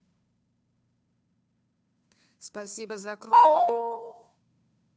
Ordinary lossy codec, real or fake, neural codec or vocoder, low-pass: none; fake; codec, 16 kHz, 2 kbps, FunCodec, trained on Chinese and English, 25 frames a second; none